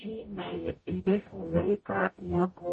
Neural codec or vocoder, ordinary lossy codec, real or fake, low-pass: codec, 44.1 kHz, 0.9 kbps, DAC; MP3, 32 kbps; fake; 10.8 kHz